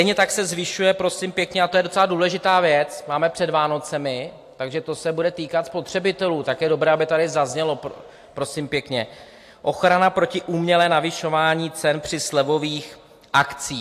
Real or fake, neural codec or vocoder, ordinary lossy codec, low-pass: real; none; AAC, 64 kbps; 14.4 kHz